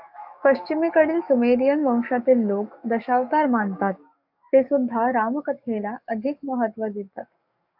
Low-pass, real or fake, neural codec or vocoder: 5.4 kHz; fake; codec, 44.1 kHz, 7.8 kbps, DAC